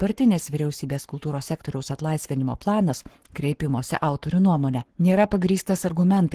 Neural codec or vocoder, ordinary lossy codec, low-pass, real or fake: codec, 44.1 kHz, 7.8 kbps, Pupu-Codec; Opus, 16 kbps; 14.4 kHz; fake